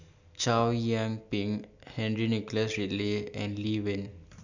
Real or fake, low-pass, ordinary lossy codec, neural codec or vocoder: real; 7.2 kHz; none; none